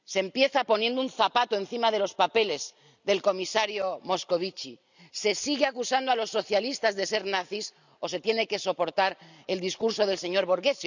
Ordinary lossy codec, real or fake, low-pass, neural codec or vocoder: none; real; 7.2 kHz; none